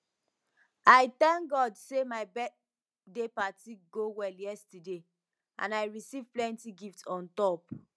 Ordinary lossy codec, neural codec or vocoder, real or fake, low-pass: none; none; real; none